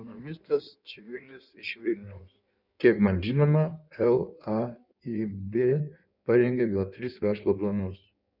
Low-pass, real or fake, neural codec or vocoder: 5.4 kHz; fake; codec, 16 kHz in and 24 kHz out, 1.1 kbps, FireRedTTS-2 codec